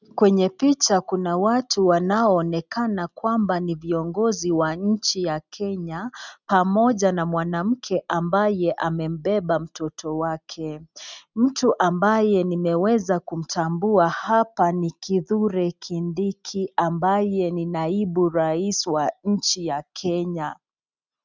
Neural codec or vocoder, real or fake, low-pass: none; real; 7.2 kHz